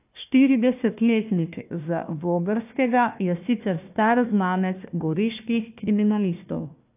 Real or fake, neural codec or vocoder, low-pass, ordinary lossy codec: fake; codec, 16 kHz, 1 kbps, FunCodec, trained on Chinese and English, 50 frames a second; 3.6 kHz; AAC, 32 kbps